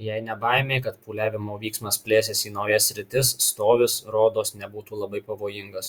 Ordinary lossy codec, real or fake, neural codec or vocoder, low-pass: Opus, 64 kbps; fake; vocoder, 48 kHz, 128 mel bands, Vocos; 19.8 kHz